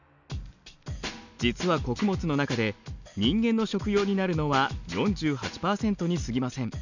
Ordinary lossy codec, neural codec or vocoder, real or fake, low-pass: none; none; real; 7.2 kHz